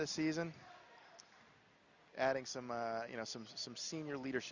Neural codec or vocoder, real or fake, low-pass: none; real; 7.2 kHz